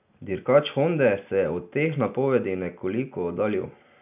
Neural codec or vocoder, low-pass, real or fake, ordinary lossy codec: none; 3.6 kHz; real; none